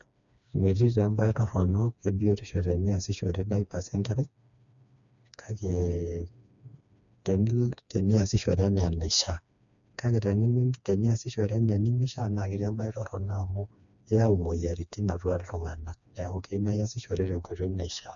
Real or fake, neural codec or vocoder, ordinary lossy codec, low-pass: fake; codec, 16 kHz, 2 kbps, FreqCodec, smaller model; none; 7.2 kHz